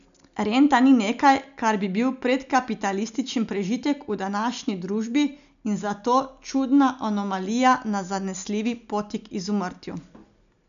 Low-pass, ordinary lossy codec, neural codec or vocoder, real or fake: 7.2 kHz; none; none; real